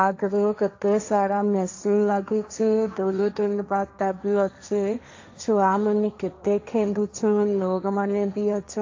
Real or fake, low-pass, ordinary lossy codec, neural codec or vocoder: fake; 7.2 kHz; AAC, 48 kbps; codec, 16 kHz, 1.1 kbps, Voila-Tokenizer